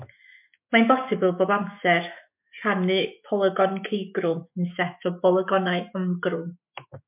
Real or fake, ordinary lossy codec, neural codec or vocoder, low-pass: fake; MP3, 32 kbps; autoencoder, 48 kHz, 128 numbers a frame, DAC-VAE, trained on Japanese speech; 3.6 kHz